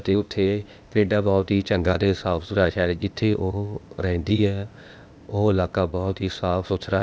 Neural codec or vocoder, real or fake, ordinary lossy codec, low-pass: codec, 16 kHz, 0.8 kbps, ZipCodec; fake; none; none